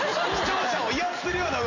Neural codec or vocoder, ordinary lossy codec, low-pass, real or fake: none; none; 7.2 kHz; real